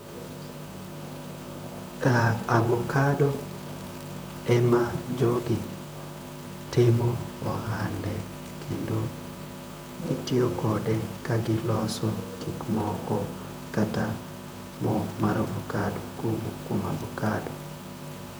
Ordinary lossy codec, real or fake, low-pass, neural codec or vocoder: none; fake; none; vocoder, 44.1 kHz, 128 mel bands, Pupu-Vocoder